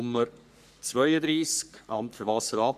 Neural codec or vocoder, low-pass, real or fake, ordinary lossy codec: codec, 44.1 kHz, 3.4 kbps, Pupu-Codec; 14.4 kHz; fake; none